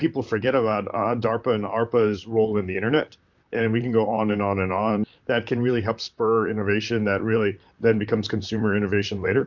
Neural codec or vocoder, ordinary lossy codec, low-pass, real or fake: vocoder, 44.1 kHz, 128 mel bands, Pupu-Vocoder; MP3, 64 kbps; 7.2 kHz; fake